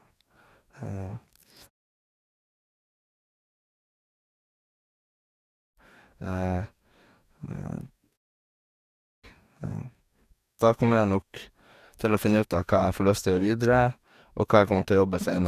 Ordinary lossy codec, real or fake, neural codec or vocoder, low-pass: none; fake; codec, 44.1 kHz, 2.6 kbps, DAC; 14.4 kHz